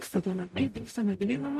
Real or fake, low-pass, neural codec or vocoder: fake; 14.4 kHz; codec, 44.1 kHz, 0.9 kbps, DAC